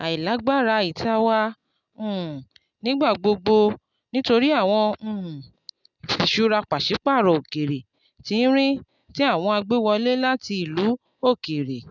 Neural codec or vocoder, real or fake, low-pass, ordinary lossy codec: none; real; 7.2 kHz; none